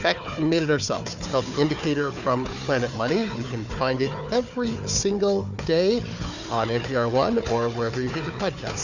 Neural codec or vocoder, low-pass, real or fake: codec, 16 kHz, 4 kbps, FunCodec, trained on Chinese and English, 50 frames a second; 7.2 kHz; fake